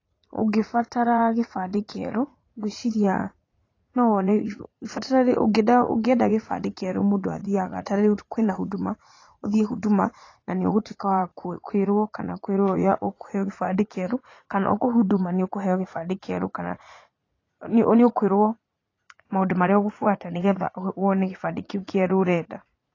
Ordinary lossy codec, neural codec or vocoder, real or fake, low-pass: AAC, 32 kbps; vocoder, 44.1 kHz, 80 mel bands, Vocos; fake; 7.2 kHz